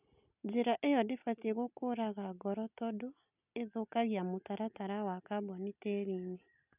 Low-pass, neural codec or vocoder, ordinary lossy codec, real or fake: 3.6 kHz; none; none; real